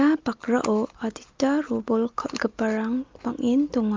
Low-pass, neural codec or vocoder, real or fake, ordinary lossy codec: 7.2 kHz; none; real; Opus, 24 kbps